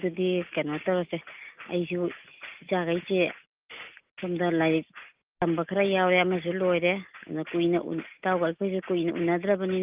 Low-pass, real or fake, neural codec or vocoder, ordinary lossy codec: 3.6 kHz; real; none; Opus, 24 kbps